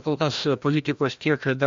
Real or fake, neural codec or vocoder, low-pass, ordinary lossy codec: fake; codec, 16 kHz, 1 kbps, FreqCodec, larger model; 7.2 kHz; MP3, 48 kbps